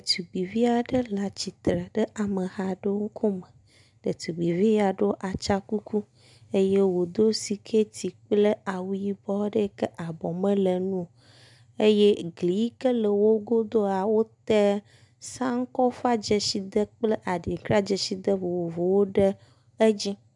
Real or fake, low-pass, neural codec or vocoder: real; 10.8 kHz; none